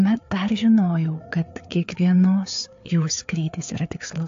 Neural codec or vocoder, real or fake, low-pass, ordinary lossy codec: codec, 16 kHz, 4 kbps, FreqCodec, larger model; fake; 7.2 kHz; AAC, 48 kbps